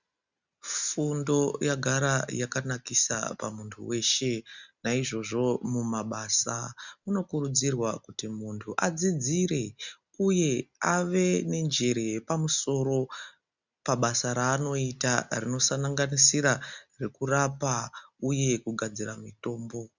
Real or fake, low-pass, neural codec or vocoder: real; 7.2 kHz; none